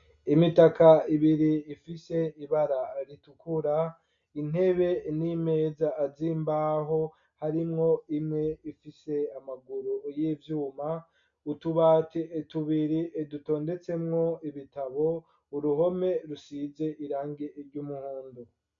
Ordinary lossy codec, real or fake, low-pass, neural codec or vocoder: MP3, 64 kbps; real; 7.2 kHz; none